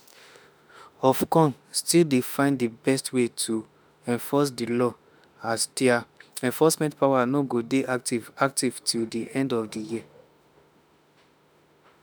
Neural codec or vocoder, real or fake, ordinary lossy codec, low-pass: autoencoder, 48 kHz, 32 numbers a frame, DAC-VAE, trained on Japanese speech; fake; none; none